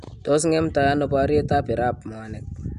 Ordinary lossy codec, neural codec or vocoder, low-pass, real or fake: MP3, 96 kbps; none; 10.8 kHz; real